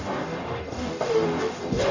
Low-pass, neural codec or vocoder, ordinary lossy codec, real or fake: 7.2 kHz; codec, 44.1 kHz, 0.9 kbps, DAC; none; fake